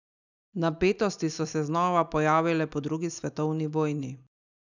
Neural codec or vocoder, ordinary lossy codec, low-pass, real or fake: none; none; 7.2 kHz; real